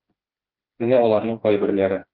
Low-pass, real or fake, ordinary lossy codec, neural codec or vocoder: 5.4 kHz; fake; Opus, 24 kbps; codec, 16 kHz, 2 kbps, FreqCodec, smaller model